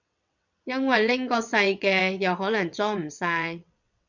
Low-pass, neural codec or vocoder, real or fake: 7.2 kHz; vocoder, 22.05 kHz, 80 mel bands, WaveNeXt; fake